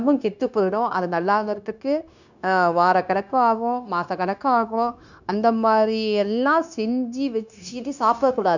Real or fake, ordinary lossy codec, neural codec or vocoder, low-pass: fake; none; codec, 16 kHz, 0.9 kbps, LongCat-Audio-Codec; 7.2 kHz